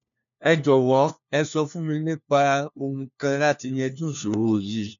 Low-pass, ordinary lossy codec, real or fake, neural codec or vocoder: 7.2 kHz; none; fake; codec, 16 kHz, 1 kbps, FunCodec, trained on LibriTTS, 50 frames a second